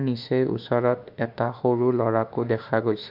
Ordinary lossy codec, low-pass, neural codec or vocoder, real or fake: none; 5.4 kHz; autoencoder, 48 kHz, 32 numbers a frame, DAC-VAE, trained on Japanese speech; fake